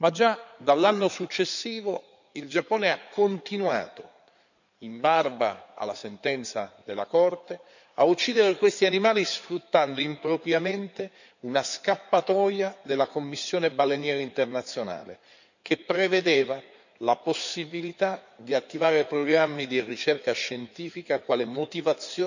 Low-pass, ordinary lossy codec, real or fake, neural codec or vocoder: 7.2 kHz; none; fake; codec, 16 kHz in and 24 kHz out, 2.2 kbps, FireRedTTS-2 codec